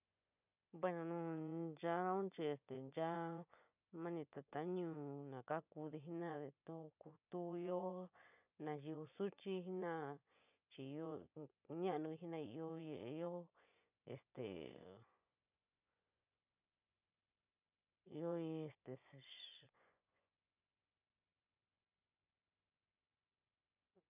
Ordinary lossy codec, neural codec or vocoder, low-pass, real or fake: none; vocoder, 24 kHz, 100 mel bands, Vocos; 3.6 kHz; fake